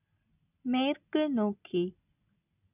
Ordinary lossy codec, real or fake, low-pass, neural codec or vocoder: none; real; 3.6 kHz; none